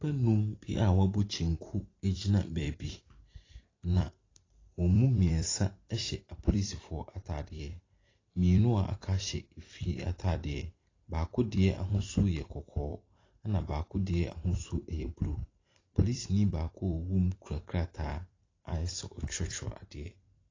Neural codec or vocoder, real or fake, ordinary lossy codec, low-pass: vocoder, 44.1 kHz, 128 mel bands every 256 samples, BigVGAN v2; fake; AAC, 32 kbps; 7.2 kHz